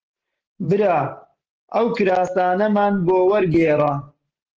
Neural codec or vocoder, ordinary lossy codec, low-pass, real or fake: none; Opus, 16 kbps; 7.2 kHz; real